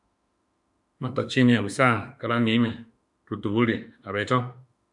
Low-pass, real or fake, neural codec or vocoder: 10.8 kHz; fake; autoencoder, 48 kHz, 32 numbers a frame, DAC-VAE, trained on Japanese speech